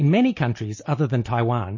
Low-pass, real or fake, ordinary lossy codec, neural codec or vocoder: 7.2 kHz; real; MP3, 32 kbps; none